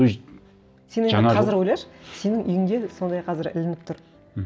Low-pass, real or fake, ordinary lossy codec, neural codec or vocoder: none; real; none; none